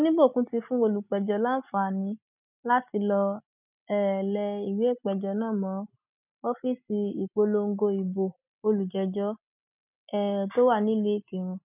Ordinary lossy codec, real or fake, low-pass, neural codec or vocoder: none; real; 3.6 kHz; none